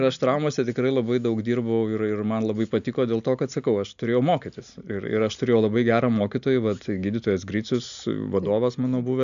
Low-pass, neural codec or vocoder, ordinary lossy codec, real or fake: 7.2 kHz; none; AAC, 96 kbps; real